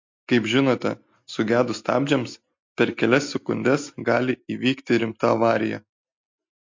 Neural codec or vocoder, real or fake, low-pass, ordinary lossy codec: none; real; 7.2 kHz; MP3, 48 kbps